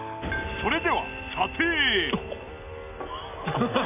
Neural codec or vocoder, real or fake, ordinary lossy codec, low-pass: none; real; none; 3.6 kHz